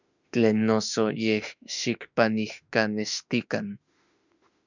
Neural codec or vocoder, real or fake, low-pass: autoencoder, 48 kHz, 32 numbers a frame, DAC-VAE, trained on Japanese speech; fake; 7.2 kHz